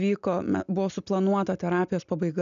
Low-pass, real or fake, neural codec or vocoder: 7.2 kHz; real; none